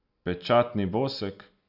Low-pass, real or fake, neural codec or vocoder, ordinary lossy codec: 5.4 kHz; real; none; none